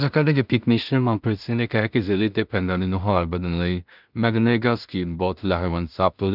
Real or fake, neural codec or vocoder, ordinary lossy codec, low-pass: fake; codec, 16 kHz in and 24 kHz out, 0.4 kbps, LongCat-Audio-Codec, two codebook decoder; none; 5.4 kHz